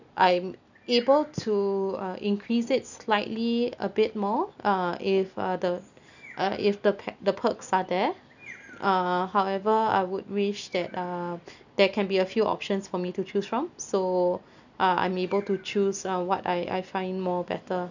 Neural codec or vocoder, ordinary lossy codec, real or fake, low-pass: none; none; real; 7.2 kHz